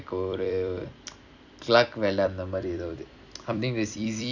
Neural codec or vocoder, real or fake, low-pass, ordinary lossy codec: vocoder, 44.1 kHz, 128 mel bands every 256 samples, BigVGAN v2; fake; 7.2 kHz; none